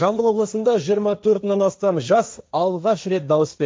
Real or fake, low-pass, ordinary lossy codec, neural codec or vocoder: fake; none; none; codec, 16 kHz, 1.1 kbps, Voila-Tokenizer